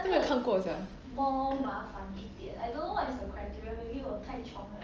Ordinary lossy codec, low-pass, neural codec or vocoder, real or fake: Opus, 24 kbps; 7.2 kHz; none; real